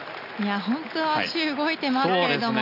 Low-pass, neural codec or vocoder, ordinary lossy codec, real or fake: 5.4 kHz; none; none; real